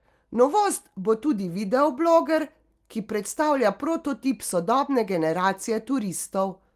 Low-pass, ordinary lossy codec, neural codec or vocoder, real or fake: 14.4 kHz; Opus, 24 kbps; none; real